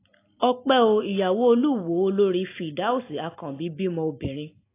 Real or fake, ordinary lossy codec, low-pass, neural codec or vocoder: real; AAC, 24 kbps; 3.6 kHz; none